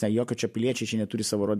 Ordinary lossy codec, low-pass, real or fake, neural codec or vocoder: MP3, 64 kbps; 14.4 kHz; real; none